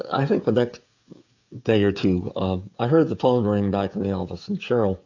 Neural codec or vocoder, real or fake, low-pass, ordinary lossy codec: codec, 16 kHz, 16 kbps, FreqCodec, smaller model; fake; 7.2 kHz; AAC, 48 kbps